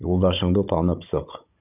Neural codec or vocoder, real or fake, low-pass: codec, 16 kHz, 16 kbps, FunCodec, trained on Chinese and English, 50 frames a second; fake; 3.6 kHz